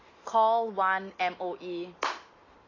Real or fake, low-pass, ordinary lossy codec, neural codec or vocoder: real; 7.2 kHz; AAC, 32 kbps; none